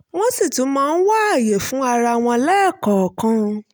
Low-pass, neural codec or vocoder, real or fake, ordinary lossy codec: none; none; real; none